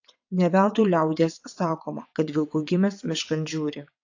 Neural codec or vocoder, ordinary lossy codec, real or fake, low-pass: vocoder, 22.05 kHz, 80 mel bands, WaveNeXt; AAC, 48 kbps; fake; 7.2 kHz